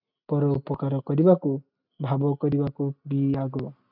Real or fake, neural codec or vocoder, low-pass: real; none; 5.4 kHz